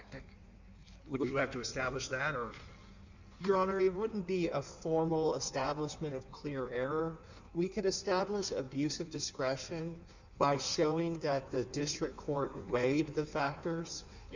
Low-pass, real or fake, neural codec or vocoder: 7.2 kHz; fake; codec, 16 kHz in and 24 kHz out, 1.1 kbps, FireRedTTS-2 codec